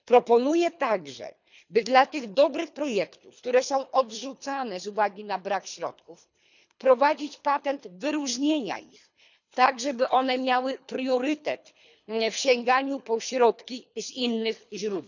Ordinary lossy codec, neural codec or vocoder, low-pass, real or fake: none; codec, 24 kHz, 3 kbps, HILCodec; 7.2 kHz; fake